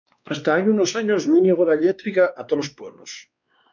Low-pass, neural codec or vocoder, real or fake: 7.2 kHz; codec, 16 kHz, 2 kbps, X-Codec, WavLM features, trained on Multilingual LibriSpeech; fake